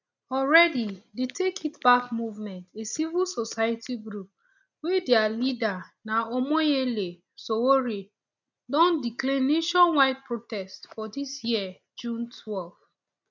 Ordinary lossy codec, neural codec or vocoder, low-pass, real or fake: none; none; 7.2 kHz; real